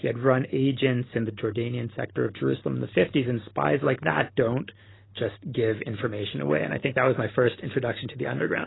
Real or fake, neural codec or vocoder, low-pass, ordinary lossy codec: real; none; 7.2 kHz; AAC, 16 kbps